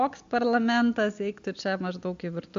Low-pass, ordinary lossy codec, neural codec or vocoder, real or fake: 7.2 kHz; AAC, 64 kbps; none; real